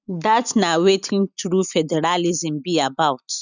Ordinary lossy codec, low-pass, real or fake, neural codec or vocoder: none; 7.2 kHz; real; none